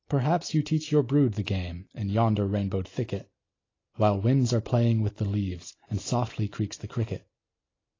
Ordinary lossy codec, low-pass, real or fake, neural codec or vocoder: AAC, 32 kbps; 7.2 kHz; real; none